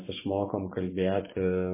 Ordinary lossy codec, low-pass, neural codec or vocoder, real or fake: MP3, 16 kbps; 3.6 kHz; vocoder, 24 kHz, 100 mel bands, Vocos; fake